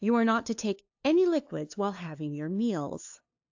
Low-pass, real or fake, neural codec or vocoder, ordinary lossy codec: 7.2 kHz; fake; codec, 16 kHz, 2 kbps, FunCodec, trained on LibriTTS, 25 frames a second; AAC, 48 kbps